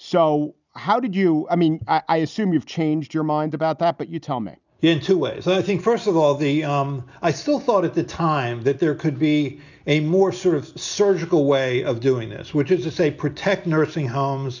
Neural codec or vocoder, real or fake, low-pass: none; real; 7.2 kHz